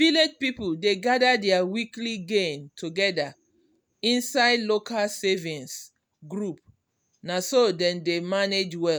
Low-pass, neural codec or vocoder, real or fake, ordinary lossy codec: none; none; real; none